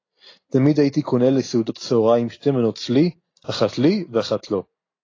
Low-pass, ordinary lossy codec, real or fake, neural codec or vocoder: 7.2 kHz; AAC, 32 kbps; real; none